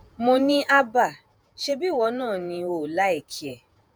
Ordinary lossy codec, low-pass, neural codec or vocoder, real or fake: none; none; vocoder, 48 kHz, 128 mel bands, Vocos; fake